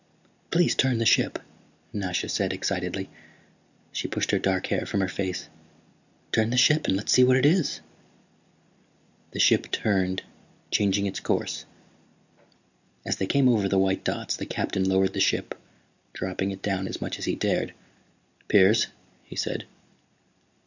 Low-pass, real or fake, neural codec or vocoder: 7.2 kHz; real; none